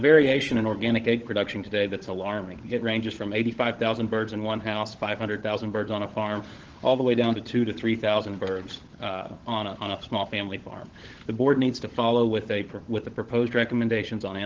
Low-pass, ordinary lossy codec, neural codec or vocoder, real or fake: 7.2 kHz; Opus, 16 kbps; codec, 16 kHz, 16 kbps, FunCodec, trained on LibriTTS, 50 frames a second; fake